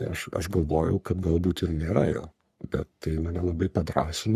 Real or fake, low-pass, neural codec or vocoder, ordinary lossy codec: fake; 14.4 kHz; codec, 44.1 kHz, 3.4 kbps, Pupu-Codec; Opus, 64 kbps